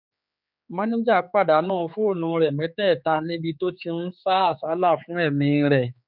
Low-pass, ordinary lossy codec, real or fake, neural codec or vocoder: 5.4 kHz; none; fake; codec, 16 kHz, 4 kbps, X-Codec, HuBERT features, trained on general audio